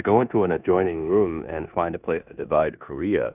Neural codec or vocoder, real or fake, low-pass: codec, 16 kHz in and 24 kHz out, 0.9 kbps, LongCat-Audio-Codec, four codebook decoder; fake; 3.6 kHz